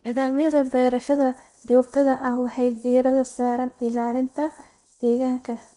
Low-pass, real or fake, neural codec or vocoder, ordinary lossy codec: 10.8 kHz; fake; codec, 16 kHz in and 24 kHz out, 0.8 kbps, FocalCodec, streaming, 65536 codes; none